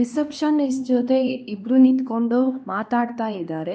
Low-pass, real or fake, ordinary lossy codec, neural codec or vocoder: none; fake; none; codec, 16 kHz, 2 kbps, X-Codec, HuBERT features, trained on LibriSpeech